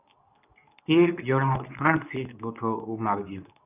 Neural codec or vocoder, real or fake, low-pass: codec, 24 kHz, 0.9 kbps, WavTokenizer, medium speech release version 2; fake; 3.6 kHz